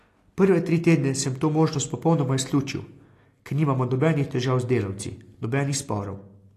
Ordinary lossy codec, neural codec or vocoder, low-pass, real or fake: AAC, 48 kbps; none; 14.4 kHz; real